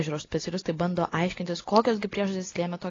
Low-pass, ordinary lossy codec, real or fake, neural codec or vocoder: 7.2 kHz; AAC, 32 kbps; real; none